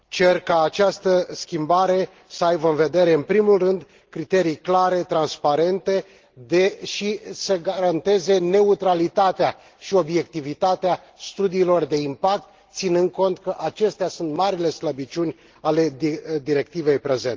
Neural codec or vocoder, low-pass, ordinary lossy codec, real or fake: none; 7.2 kHz; Opus, 16 kbps; real